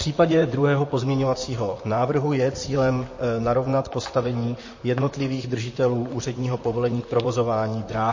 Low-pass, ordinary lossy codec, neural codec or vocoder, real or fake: 7.2 kHz; MP3, 32 kbps; vocoder, 44.1 kHz, 128 mel bands, Pupu-Vocoder; fake